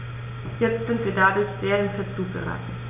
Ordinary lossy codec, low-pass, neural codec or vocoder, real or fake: none; 3.6 kHz; none; real